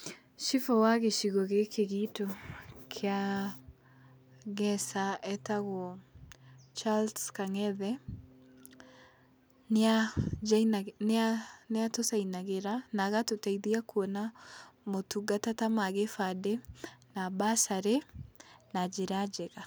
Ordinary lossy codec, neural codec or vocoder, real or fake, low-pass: none; none; real; none